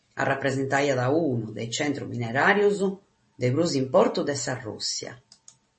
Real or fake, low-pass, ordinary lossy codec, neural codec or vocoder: real; 10.8 kHz; MP3, 32 kbps; none